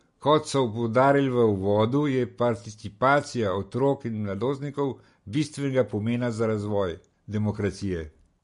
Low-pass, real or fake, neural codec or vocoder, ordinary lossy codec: 10.8 kHz; real; none; MP3, 48 kbps